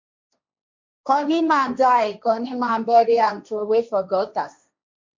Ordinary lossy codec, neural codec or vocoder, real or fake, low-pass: MP3, 48 kbps; codec, 16 kHz, 1.1 kbps, Voila-Tokenizer; fake; 7.2 kHz